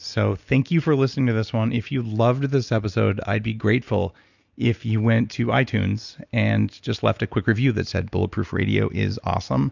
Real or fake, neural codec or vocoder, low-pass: real; none; 7.2 kHz